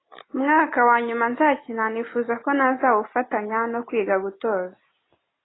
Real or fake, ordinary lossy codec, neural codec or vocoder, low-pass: real; AAC, 16 kbps; none; 7.2 kHz